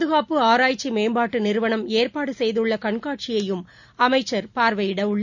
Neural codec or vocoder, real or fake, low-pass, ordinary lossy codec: none; real; 7.2 kHz; none